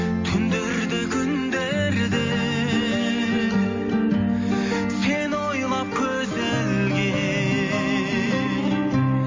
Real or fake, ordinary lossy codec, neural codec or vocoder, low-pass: real; MP3, 32 kbps; none; 7.2 kHz